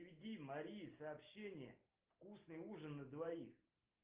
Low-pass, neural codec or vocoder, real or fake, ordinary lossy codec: 3.6 kHz; none; real; Opus, 32 kbps